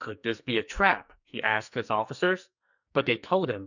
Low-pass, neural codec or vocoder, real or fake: 7.2 kHz; codec, 44.1 kHz, 2.6 kbps, SNAC; fake